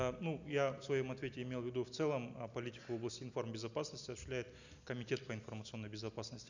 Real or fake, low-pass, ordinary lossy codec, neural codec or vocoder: real; 7.2 kHz; none; none